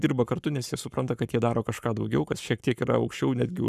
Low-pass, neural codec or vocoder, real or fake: 14.4 kHz; codec, 44.1 kHz, 7.8 kbps, Pupu-Codec; fake